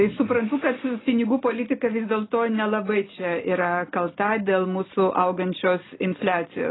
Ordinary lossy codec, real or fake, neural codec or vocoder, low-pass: AAC, 16 kbps; real; none; 7.2 kHz